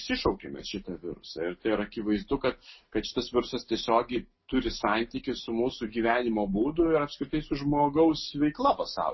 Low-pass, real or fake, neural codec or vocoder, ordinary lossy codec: 7.2 kHz; real; none; MP3, 24 kbps